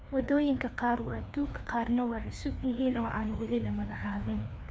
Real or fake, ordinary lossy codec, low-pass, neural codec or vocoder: fake; none; none; codec, 16 kHz, 2 kbps, FreqCodec, larger model